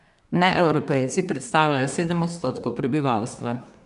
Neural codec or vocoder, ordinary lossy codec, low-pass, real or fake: codec, 24 kHz, 1 kbps, SNAC; none; 10.8 kHz; fake